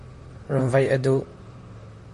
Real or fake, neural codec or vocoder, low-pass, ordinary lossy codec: fake; vocoder, 44.1 kHz, 128 mel bands, Pupu-Vocoder; 14.4 kHz; MP3, 48 kbps